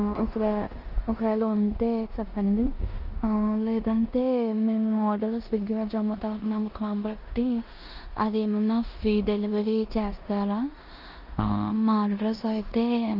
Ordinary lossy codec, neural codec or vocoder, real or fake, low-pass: Opus, 24 kbps; codec, 16 kHz in and 24 kHz out, 0.9 kbps, LongCat-Audio-Codec, four codebook decoder; fake; 5.4 kHz